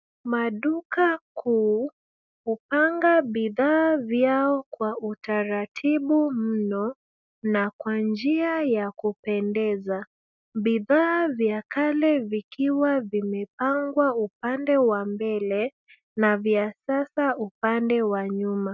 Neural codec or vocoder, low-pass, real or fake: none; 7.2 kHz; real